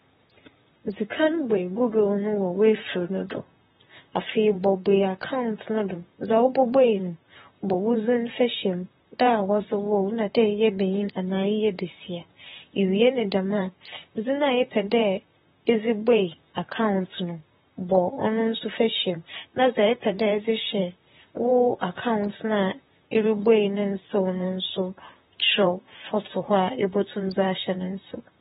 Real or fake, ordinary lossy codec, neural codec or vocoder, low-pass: fake; AAC, 16 kbps; vocoder, 44.1 kHz, 128 mel bands, Pupu-Vocoder; 19.8 kHz